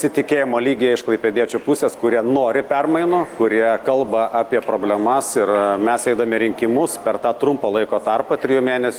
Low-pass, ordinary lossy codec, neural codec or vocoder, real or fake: 19.8 kHz; Opus, 24 kbps; none; real